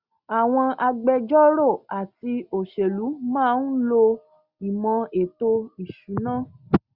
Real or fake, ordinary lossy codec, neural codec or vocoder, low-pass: real; Opus, 64 kbps; none; 5.4 kHz